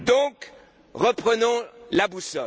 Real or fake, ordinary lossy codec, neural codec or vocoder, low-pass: real; none; none; none